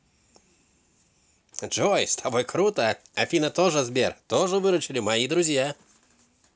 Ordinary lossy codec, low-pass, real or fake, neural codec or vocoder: none; none; real; none